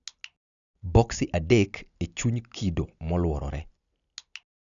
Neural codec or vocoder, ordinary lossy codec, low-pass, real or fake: none; none; 7.2 kHz; real